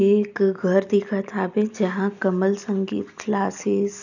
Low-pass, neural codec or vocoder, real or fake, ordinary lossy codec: 7.2 kHz; none; real; none